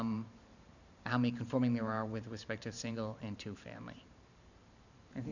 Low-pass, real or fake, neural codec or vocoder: 7.2 kHz; fake; vocoder, 44.1 kHz, 128 mel bands every 512 samples, BigVGAN v2